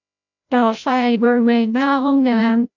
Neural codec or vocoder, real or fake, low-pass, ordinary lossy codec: codec, 16 kHz, 0.5 kbps, FreqCodec, larger model; fake; 7.2 kHz; none